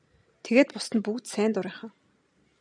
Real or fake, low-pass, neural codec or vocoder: real; 9.9 kHz; none